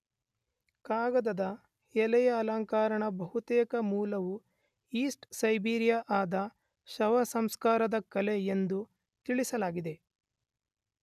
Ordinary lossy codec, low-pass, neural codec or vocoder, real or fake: none; 14.4 kHz; none; real